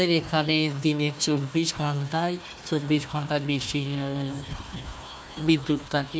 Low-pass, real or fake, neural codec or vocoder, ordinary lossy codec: none; fake; codec, 16 kHz, 1 kbps, FunCodec, trained on Chinese and English, 50 frames a second; none